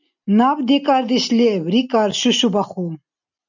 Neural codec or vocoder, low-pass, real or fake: none; 7.2 kHz; real